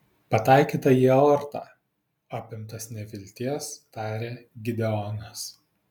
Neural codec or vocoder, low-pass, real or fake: none; 19.8 kHz; real